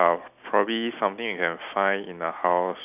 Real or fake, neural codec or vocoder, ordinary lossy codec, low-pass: real; none; none; 3.6 kHz